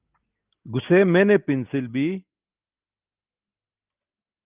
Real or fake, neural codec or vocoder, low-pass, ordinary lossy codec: real; none; 3.6 kHz; Opus, 32 kbps